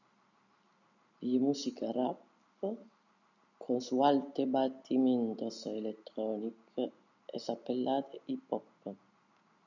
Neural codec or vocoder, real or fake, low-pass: none; real; 7.2 kHz